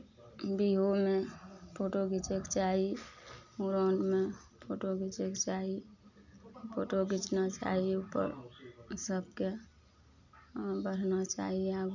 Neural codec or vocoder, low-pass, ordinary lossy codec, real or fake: none; 7.2 kHz; none; real